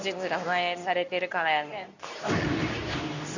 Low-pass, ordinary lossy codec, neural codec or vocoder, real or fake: 7.2 kHz; none; codec, 24 kHz, 0.9 kbps, WavTokenizer, medium speech release version 2; fake